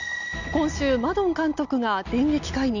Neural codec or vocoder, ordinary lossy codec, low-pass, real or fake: none; none; 7.2 kHz; real